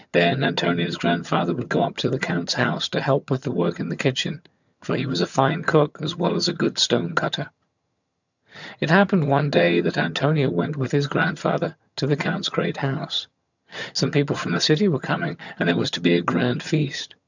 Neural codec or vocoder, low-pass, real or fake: vocoder, 22.05 kHz, 80 mel bands, HiFi-GAN; 7.2 kHz; fake